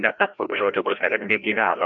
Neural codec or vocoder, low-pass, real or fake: codec, 16 kHz, 1 kbps, FreqCodec, larger model; 7.2 kHz; fake